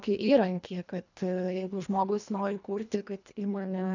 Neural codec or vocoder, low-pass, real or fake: codec, 24 kHz, 1.5 kbps, HILCodec; 7.2 kHz; fake